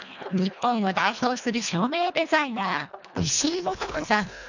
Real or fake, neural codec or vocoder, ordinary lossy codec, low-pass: fake; codec, 24 kHz, 1.5 kbps, HILCodec; none; 7.2 kHz